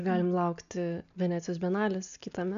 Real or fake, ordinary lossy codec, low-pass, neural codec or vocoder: real; MP3, 96 kbps; 7.2 kHz; none